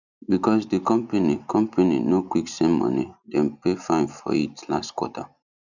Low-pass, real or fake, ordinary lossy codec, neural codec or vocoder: 7.2 kHz; real; none; none